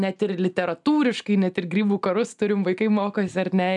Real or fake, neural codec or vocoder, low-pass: real; none; 10.8 kHz